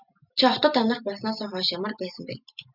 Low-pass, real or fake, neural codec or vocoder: 5.4 kHz; real; none